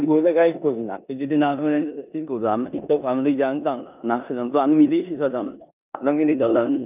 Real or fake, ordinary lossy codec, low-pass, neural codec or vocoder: fake; none; 3.6 kHz; codec, 16 kHz in and 24 kHz out, 0.9 kbps, LongCat-Audio-Codec, four codebook decoder